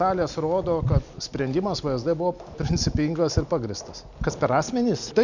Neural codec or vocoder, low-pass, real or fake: none; 7.2 kHz; real